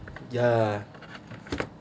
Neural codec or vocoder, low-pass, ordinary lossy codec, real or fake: none; none; none; real